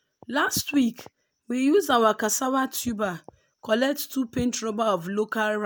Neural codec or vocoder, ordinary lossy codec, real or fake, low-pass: none; none; real; none